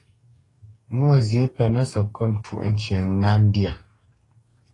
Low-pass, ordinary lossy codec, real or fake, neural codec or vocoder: 10.8 kHz; AAC, 32 kbps; fake; codec, 44.1 kHz, 2.6 kbps, DAC